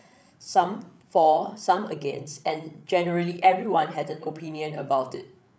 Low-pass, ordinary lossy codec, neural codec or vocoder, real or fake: none; none; codec, 16 kHz, 8 kbps, FreqCodec, larger model; fake